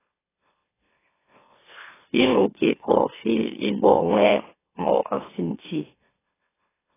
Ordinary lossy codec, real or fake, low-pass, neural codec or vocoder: AAC, 16 kbps; fake; 3.6 kHz; autoencoder, 44.1 kHz, a latent of 192 numbers a frame, MeloTTS